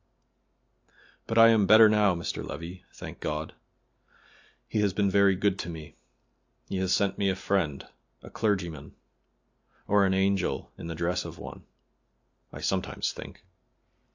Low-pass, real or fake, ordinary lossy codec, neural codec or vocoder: 7.2 kHz; real; AAC, 48 kbps; none